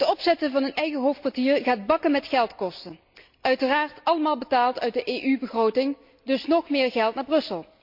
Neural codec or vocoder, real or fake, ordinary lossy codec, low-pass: none; real; MP3, 48 kbps; 5.4 kHz